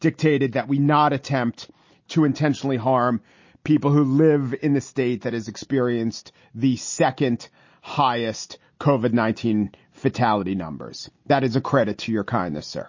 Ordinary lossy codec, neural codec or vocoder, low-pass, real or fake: MP3, 32 kbps; none; 7.2 kHz; real